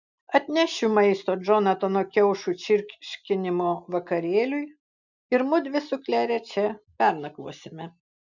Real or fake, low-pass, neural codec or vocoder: real; 7.2 kHz; none